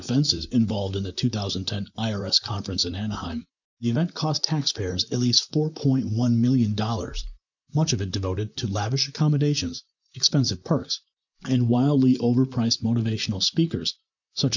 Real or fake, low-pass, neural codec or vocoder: fake; 7.2 kHz; vocoder, 44.1 kHz, 128 mel bands, Pupu-Vocoder